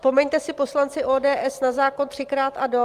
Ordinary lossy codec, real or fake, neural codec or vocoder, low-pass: Opus, 32 kbps; real; none; 14.4 kHz